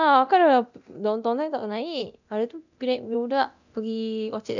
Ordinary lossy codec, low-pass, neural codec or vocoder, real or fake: none; 7.2 kHz; codec, 24 kHz, 0.9 kbps, DualCodec; fake